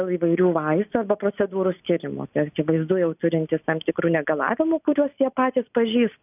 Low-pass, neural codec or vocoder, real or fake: 3.6 kHz; none; real